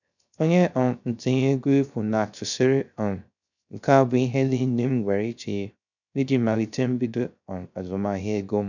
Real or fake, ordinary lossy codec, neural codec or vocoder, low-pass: fake; none; codec, 16 kHz, 0.3 kbps, FocalCodec; 7.2 kHz